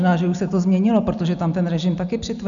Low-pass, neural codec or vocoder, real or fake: 7.2 kHz; none; real